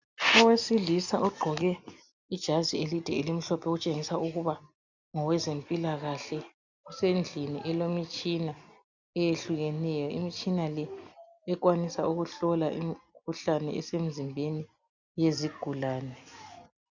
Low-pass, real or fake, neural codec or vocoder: 7.2 kHz; real; none